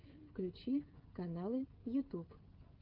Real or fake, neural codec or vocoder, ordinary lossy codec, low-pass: fake; codec, 16 kHz, 16 kbps, FreqCodec, smaller model; Opus, 32 kbps; 5.4 kHz